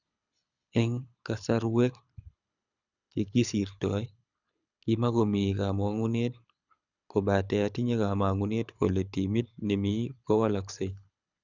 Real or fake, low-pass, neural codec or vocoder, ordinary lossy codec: fake; 7.2 kHz; codec, 24 kHz, 6 kbps, HILCodec; none